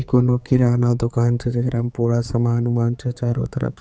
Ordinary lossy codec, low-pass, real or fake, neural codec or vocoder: none; none; fake; codec, 16 kHz, 4 kbps, X-Codec, HuBERT features, trained on general audio